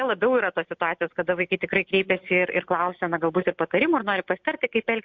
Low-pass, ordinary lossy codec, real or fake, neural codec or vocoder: 7.2 kHz; MP3, 64 kbps; real; none